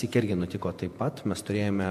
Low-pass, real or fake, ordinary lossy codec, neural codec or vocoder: 14.4 kHz; fake; MP3, 64 kbps; vocoder, 48 kHz, 128 mel bands, Vocos